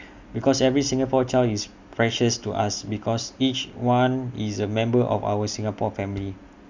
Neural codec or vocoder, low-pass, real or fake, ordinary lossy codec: none; 7.2 kHz; real; Opus, 64 kbps